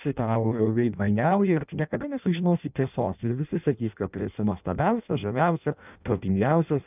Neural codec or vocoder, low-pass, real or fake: codec, 16 kHz in and 24 kHz out, 0.6 kbps, FireRedTTS-2 codec; 3.6 kHz; fake